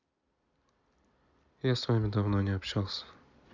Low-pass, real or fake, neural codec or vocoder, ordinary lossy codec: 7.2 kHz; real; none; Opus, 64 kbps